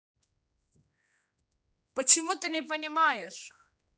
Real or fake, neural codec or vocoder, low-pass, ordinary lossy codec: fake; codec, 16 kHz, 2 kbps, X-Codec, HuBERT features, trained on general audio; none; none